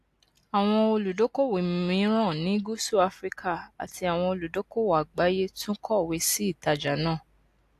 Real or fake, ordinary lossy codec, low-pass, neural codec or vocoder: real; AAC, 64 kbps; 14.4 kHz; none